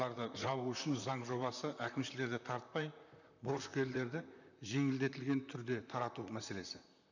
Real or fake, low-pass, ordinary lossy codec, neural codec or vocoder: fake; 7.2 kHz; none; vocoder, 44.1 kHz, 128 mel bands, Pupu-Vocoder